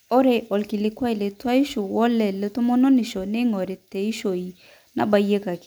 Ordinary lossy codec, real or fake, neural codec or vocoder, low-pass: none; real; none; none